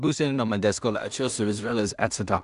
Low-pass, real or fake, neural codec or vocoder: 10.8 kHz; fake; codec, 16 kHz in and 24 kHz out, 0.4 kbps, LongCat-Audio-Codec, two codebook decoder